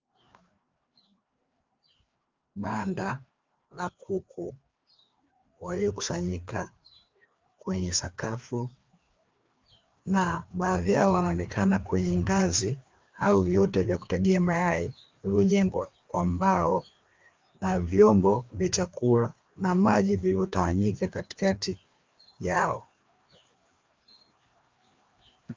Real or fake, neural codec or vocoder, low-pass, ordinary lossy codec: fake; codec, 16 kHz, 2 kbps, FreqCodec, larger model; 7.2 kHz; Opus, 24 kbps